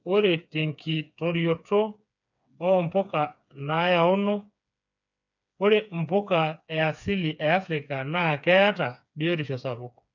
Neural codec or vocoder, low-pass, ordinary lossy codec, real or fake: codec, 16 kHz, 4 kbps, FreqCodec, smaller model; 7.2 kHz; none; fake